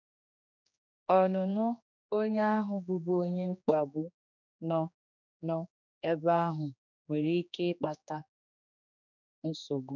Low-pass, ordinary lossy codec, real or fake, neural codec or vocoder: 7.2 kHz; none; fake; codec, 16 kHz, 2 kbps, X-Codec, HuBERT features, trained on general audio